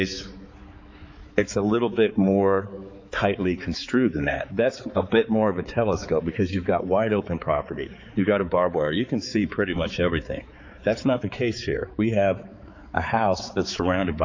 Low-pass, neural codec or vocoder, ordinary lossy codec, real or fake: 7.2 kHz; codec, 16 kHz, 4 kbps, X-Codec, HuBERT features, trained on balanced general audio; MP3, 64 kbps; fake